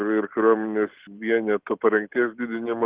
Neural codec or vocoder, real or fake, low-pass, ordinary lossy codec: none; real; 3.6 kHz; Opus, 16 kbps